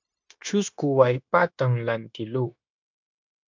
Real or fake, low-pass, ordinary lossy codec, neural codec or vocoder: fake; 7.2 kHz; MP3, 64 kbps; codec, 16 kHz, 0.9 kbps, LongCat-Audio-Codec